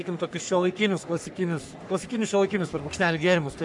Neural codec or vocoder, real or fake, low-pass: codec, 44.1 kHz, 3.4 kbps, Pupu-Codec; fake; 10.8 kHz